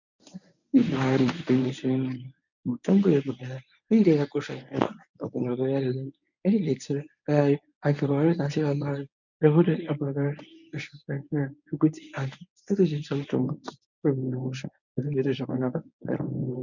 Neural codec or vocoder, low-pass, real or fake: codec, 24 kHz, 0.9 kbps, WavTokenizer, medium speech release version 1; 7.2 kHz; fake